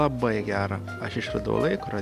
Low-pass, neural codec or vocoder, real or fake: 14.4 kHz; none; real